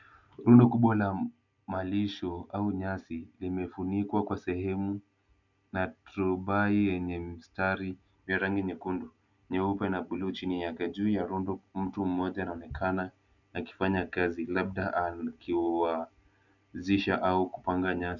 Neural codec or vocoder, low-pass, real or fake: none; 7.2 kHz; real